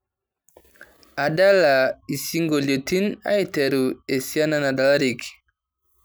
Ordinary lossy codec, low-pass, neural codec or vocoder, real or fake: none; none; none; real